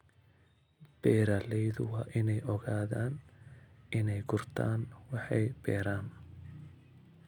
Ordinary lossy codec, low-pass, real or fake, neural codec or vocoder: none; 19.8 kHz; real; none